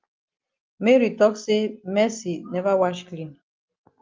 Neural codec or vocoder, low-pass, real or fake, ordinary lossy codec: none; 7.2 kHz; real; Opus, 24 kbps